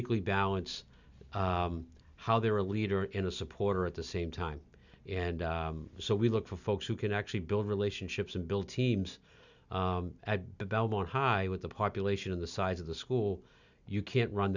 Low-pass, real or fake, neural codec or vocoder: 7.2 kHz; real; none